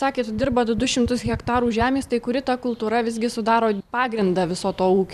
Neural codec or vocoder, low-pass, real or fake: vocoder, 44.1 kHz, 128 mel bands every 512 samples, BigVGAN v2; 14.4 kHz; fake